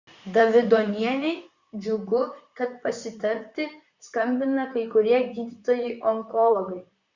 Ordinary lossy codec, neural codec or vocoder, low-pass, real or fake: Opus, 64 kbps; vocoder, 44.1 kHz, 128 mel bands, Pupu-Vocoder; 7.2 kHz; fake